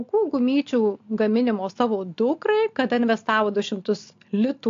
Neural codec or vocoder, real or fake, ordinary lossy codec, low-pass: none; real; AAC, 48 kbps; 7.2 kHz